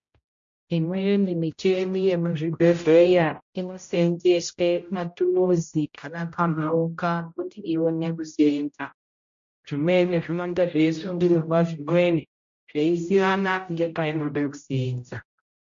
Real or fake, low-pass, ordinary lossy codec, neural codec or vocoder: fake; 7.2 kHz; MP3, 48 kbps; codec, 16 kHz, 0.5 kbps, X-Codec, HuBERT features, trained on general audio